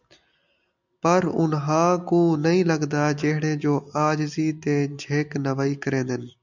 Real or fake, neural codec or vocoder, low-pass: real; none; 7.2 kHz